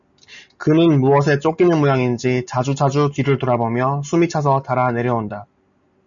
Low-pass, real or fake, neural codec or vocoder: 7.2 kHz; real; none